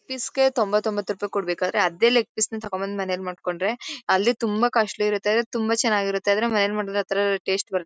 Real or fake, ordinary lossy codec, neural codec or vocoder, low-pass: real; none; none; none